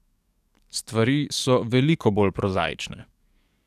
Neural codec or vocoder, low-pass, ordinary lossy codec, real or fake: codec, 44.1 kHz, 7.8 kbps, DAC; 14.4 kHz; none; fake